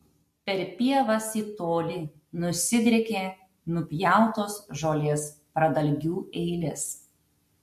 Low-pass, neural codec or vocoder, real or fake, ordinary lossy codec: 14.4 kHz; none; real; MP3, 64 kbps